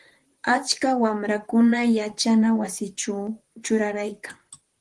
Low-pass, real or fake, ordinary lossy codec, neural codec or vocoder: 10.8 kHz; fake; Opus, 24 kbps; vocoder, 44.1 kHz, 128 mel bands, Pupu-Vocoder